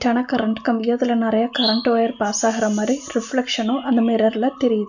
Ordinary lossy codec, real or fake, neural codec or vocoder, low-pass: none; real; none; 7.2 kHz